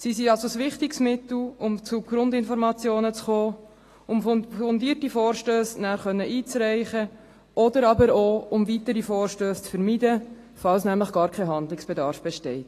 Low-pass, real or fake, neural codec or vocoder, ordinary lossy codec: 14.4 kHz; real; none; AAC, 48 kbps